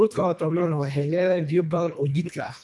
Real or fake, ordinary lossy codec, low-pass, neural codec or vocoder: fake; none; none; codec, 24 kHz, 1.5 kbps, HILCodec